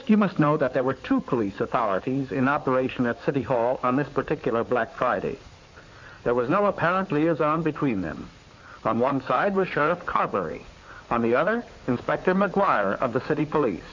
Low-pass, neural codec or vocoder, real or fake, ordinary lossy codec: 7.2 kHz; codec, 16 kHz in and 24 kHz out, 2.2 kbps, FireRedTTS-2 codec; fake; MP3, 48 kbps